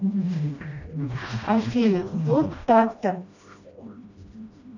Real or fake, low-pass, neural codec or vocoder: fake; 7.2 kHz; codec, 16 kHz, 1 kbps, FreqCodec, smaller model